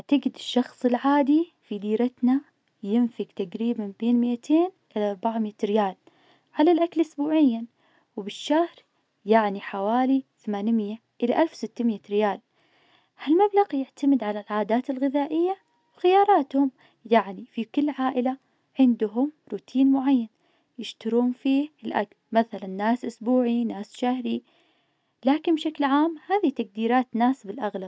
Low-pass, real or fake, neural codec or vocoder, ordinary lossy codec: none; real; none; none